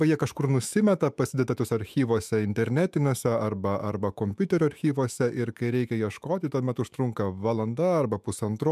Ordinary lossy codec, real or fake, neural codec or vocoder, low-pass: MP3, 96 kbps; fake; autoencoder, 48 kHz, 128 numbers a frame, DAC-VAE, trained on Japanese speech; 14.4 kHz